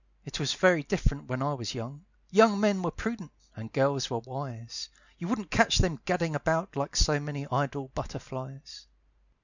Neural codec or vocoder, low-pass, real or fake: none; 7.2 kHz; real